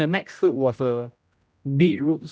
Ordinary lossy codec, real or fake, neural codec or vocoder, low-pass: none; fake; codec, 16 kHz, 0.5 kbps, X-Codec, HuBERT features, trained on general audio; none